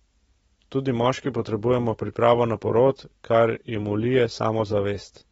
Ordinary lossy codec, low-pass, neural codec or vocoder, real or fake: AAC, 24 kbps; 19.8 kHz; none; real